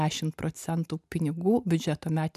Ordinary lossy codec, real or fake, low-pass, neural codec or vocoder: MP3, 96 kbps; real; 14.4 kHz; none